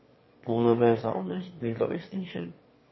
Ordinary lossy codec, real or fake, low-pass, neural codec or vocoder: MP3, 24 kbps; fake; 7.2 kHz; autoencoder, 22.05 kHz, a latent of 192 numbers a frame, VITS, trained on one speaker